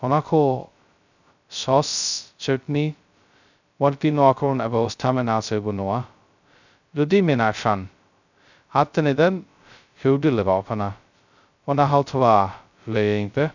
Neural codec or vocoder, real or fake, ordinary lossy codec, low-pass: codec, 16 kHz, 0.2 kbps, FocalCodec; fake; none; 7.2 kHz